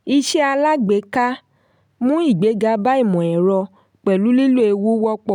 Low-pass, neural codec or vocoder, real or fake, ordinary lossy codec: 19.8 kHz; none; real; none